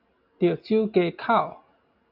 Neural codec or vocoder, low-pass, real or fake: none; 5.4 kHz; real